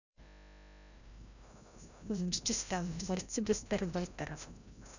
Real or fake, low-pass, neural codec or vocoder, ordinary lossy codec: fake; 7.2 kHz; codec, 16 kHz, 0.5 kbps, FreqCodec, larger model; none